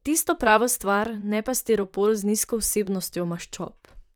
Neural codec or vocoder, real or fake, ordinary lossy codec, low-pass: vocoder, 44.1 kHz, 128 mel bands, Pupu-Vocoder; fake; none; none